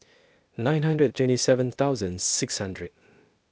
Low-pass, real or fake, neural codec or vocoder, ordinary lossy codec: none; fake; codec, 16 kHz, 0.8 kbps, ZipCodec; none